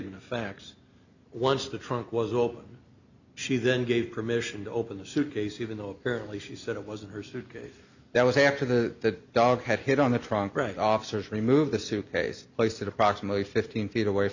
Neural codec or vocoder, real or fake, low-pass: none; real; 7.2 kHz